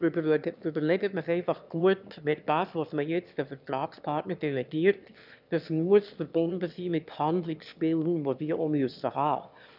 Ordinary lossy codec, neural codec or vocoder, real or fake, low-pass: none; autoencoder, 22.05 kHz, a latent of 192 numbers a frame, VITS, trained on one speaker; fake; 5.4 kHz